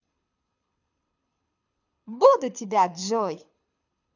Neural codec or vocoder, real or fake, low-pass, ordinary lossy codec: codec, 24 kHz, 6 kbps, HILCodec; fake; 7.2 kHz; none